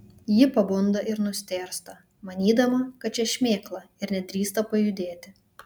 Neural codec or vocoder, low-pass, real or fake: none; 19.8 kHz; real